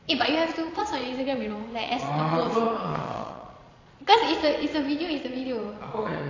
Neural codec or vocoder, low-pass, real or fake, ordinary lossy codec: vocoder, 22.05 kHz, 80 mel bands, Vocos; 7.2 kHz; fake; AAC, 32 kbps